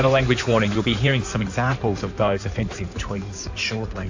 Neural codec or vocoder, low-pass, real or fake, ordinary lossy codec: codec, 16 kHz in and 24 kHz out, 2.2 kbps, FireRedTTS-2 codec; 7.2 kHz; fake; AAC, 48 kbps